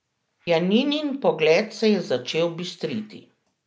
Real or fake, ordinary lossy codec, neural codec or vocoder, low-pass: real; none; none; none